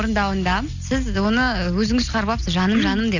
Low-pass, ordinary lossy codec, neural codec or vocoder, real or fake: 7.2 kHz; none; none; real